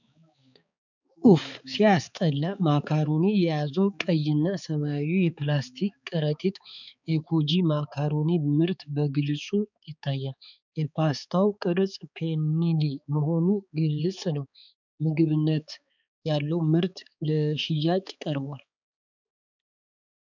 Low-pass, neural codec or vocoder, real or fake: 7.2 kHz; codec, 16 kHz, 4 kbps, X-Codec, HuBERT features, trained on balanced general audio; fake